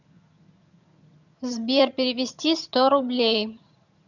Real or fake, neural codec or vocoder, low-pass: fake; vocoder, 22.05 kHz, 80 mel bands, HiFi-GAN; 7.2 kHz